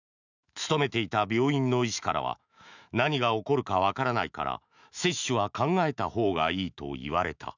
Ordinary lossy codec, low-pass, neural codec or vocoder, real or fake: none; 7.2 kHz; autoencoder, 48 kHz, 128 numbers a frame, DAC-VAE, trained on Japanese speech; fake